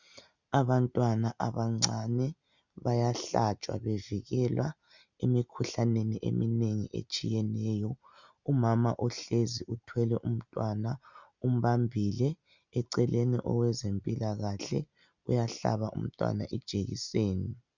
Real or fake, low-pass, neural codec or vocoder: real; 7.2 kHz; none